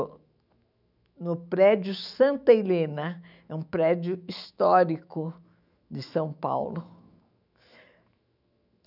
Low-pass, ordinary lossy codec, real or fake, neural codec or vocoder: 5.4 kHz; none; fake; autoencoder, 48 kHz, 128 numbers a frame, DAC-VAE, trained on Japanese speech